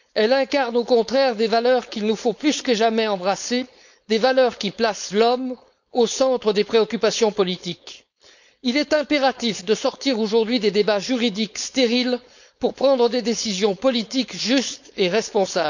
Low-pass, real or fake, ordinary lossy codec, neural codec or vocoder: 7.2 kHz; fake; none; codec, 16 kHz, 4.8 kbps, FACodec